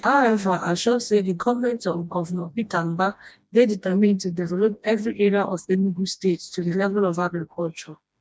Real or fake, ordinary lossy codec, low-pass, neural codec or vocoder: fake; none; none; codec, 16 kHz, 1 kbps, FreqCodec, smaller model